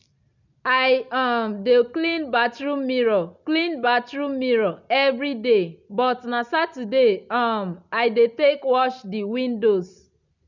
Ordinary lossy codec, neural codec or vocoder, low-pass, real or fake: none; none; 7.2 kHz; real